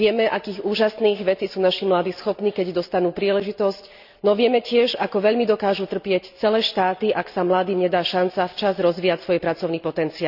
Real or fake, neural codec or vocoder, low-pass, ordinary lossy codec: real; none; 5.4 kHz; none